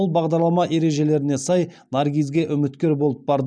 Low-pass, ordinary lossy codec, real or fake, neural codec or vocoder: 9.9 kHz; none; real; none